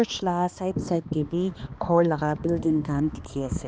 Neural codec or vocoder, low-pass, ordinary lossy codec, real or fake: codec, 16 kHz, 2 kbps, X-Codec, HuBERT features, trained on balanced general audio; none; none; fake